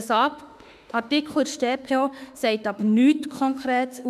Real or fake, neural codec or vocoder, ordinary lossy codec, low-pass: fake; autoencoder, 48 kHz, 32 numbers a frame, DAC-VAE, trained on Japanese speech; none; 14.4 kHz